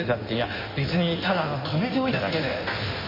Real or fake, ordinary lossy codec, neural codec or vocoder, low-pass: fake; MP3, 24 kbps; codec, 16 kHz in and 24 kHz out, 1.1 kbps, FireRedTTS-2 codec; 5.4 kHz